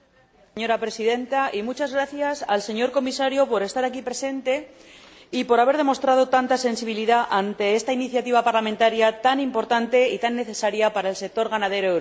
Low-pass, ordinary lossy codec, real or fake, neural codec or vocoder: none; none; real; none